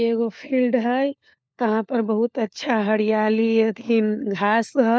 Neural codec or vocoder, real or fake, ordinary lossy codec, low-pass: codec, 16 kHz, 4 kbps, FunCodec, trained on LibriTTS, 50 frames a second; fake; none; none